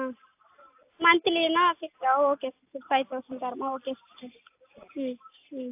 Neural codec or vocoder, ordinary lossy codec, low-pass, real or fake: none; AAC, 32 kbps; 3.6 kHz; real